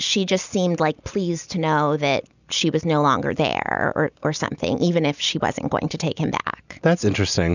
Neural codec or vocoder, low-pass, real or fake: none; 7.2 kHz; real